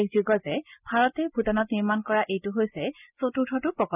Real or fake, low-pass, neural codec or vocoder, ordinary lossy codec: real; 3.6 kHz; none; none